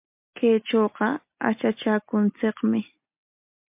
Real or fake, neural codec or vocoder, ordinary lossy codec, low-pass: real; none; MP3, 32 kbps; 3.6 kHz